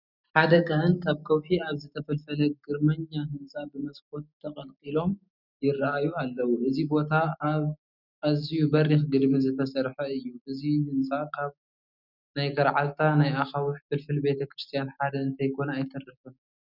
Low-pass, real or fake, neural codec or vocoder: 5.4 kHz; real; none